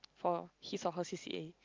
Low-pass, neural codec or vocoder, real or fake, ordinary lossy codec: 7.2 kHz; none; real; Opus, 24 kbps